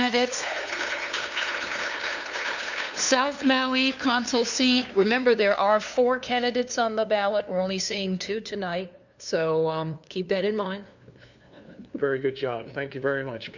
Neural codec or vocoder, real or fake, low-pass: codec, 16 kHz, 2 kbps, FunCodec, trained on LibriTTS, 25 frames a second; fake; 7.2 kHz